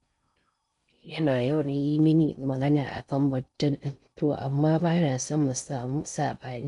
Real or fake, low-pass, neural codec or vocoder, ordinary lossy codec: fake; 10.8 kHz; codec, 16 kHz in and 24 kHz out, 0.6 kbps, FocalCodec, streaming, 4096 codes; none